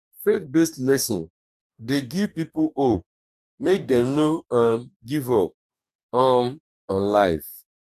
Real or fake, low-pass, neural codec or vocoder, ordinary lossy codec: fake; 14.4 kHz; codec, 44.1 kHz, 2.6 kbps, DAC; none